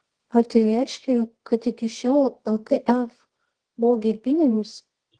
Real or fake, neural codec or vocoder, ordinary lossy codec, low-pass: fake; codec, 24 kHz, 0.9 kbps, WavTokenizer, medium music audio release; Opus, 16 kbps; 9.9 kHz